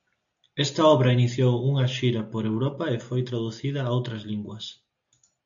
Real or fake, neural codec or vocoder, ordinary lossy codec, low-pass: real; none; AAC, 64 kbps; 7.2 kHz